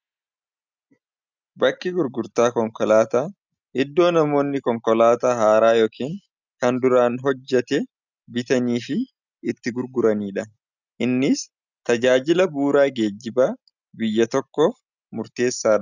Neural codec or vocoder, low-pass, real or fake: none; 7.2 kHz; real